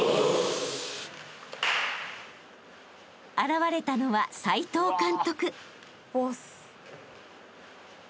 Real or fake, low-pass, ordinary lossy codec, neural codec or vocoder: real; none; none; none